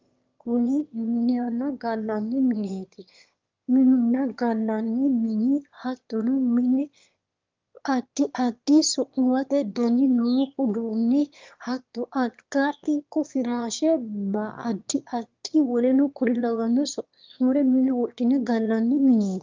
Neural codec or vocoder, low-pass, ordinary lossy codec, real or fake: autoencoder, 22.05 kHz, a latent of 192 numbers a frame, VITS, trained on one speaker; 7.2 kHz; Opus, 32 kbps; fake